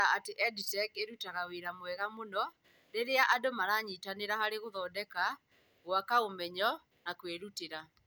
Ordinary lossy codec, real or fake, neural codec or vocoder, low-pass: none; real; none; none